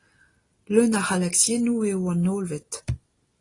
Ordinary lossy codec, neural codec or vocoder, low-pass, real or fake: AAC, 32 kbps; none; 10.8 kHz; real